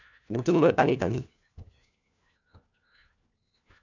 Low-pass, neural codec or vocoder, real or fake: 7.2 kHz; codec, 16 kHz, 1 kbps, FunCodec, trained on LibriTTS, 50 frames a second; fake